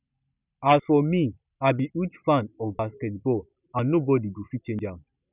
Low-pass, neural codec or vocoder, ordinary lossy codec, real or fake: 3.6 kHz; none; none; real